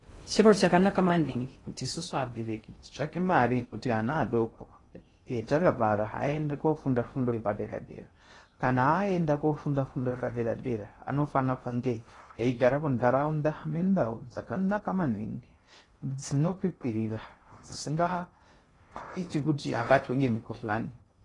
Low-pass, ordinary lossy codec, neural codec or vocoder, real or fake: 10.8 kHz; AAC, 32 kbps; codec, 16 kHz in and 24 kHz out, 0.6 kbps, FocalCodec, streaming, 2048 codes; fake